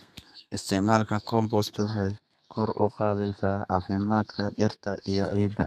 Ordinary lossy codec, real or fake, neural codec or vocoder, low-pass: none; fake; codec, 32 kHz, 1.9 kbps, SNAC; 14.4 kHz